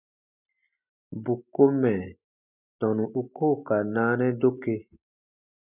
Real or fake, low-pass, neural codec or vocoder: real; 3.6 kHz; none